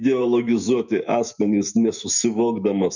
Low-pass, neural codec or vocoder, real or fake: 7.2 kHz; autoencoder, 48 kHz, 128 numbers a frame, DAC-VAE, trained on Japanese speech; fake